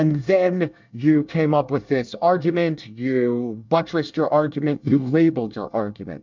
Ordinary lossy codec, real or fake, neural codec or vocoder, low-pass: MP3, 64 kbps; fake; codec, 24 kHz, 1 kbps, SNAC; 7.2 kHz